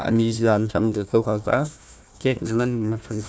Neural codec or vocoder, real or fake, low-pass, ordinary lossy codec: codec, 16 kHz, 1 kbps, FunCodec, trained on Chinese and English, 50 frames a second; fake; none; none